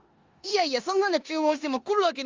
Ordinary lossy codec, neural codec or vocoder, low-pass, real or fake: Opus, 32 kbps; codec, 16 kHz in and 24 kHz out, 0.9 kbps, LongCat-Audio-Codec, four codebook decoder; 7.2 kHz; fake